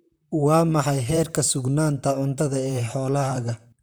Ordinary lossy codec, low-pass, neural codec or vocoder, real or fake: none; none; vocoder, 44.1 kHz, 128 mel bands, Pupu-Vocoder; fake